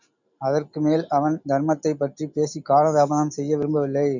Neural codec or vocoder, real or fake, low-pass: none; real; 7.2 kHz